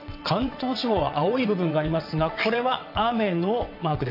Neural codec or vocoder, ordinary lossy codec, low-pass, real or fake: vocoder, 22.05 kHz, 80 mel bands, WaveNeXt; none; 5.4 kHz; fake